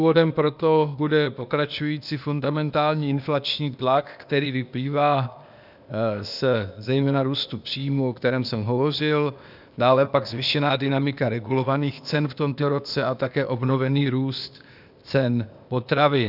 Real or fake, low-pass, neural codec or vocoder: fake; 5.4 kHz; codec, 16 kHz, 0.8 kbps, ZipCodec